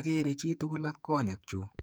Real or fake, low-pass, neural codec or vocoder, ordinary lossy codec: fake; none; codec, 44.1 kHz, 2.6 kbps, SNAC; none